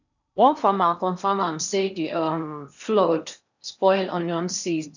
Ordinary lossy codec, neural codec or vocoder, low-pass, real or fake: none; codec, 16 kHz in and 24 kHz out, 0.8 kbps, FocalCodec, streaming, 65536 codes; 7.2 kHz; fake